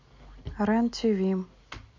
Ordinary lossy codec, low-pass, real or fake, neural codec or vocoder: MP3, 64 kbps; 7.2 kHz; real; none